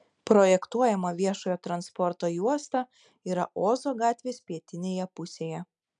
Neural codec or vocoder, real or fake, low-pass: none; real; 9.9 kHz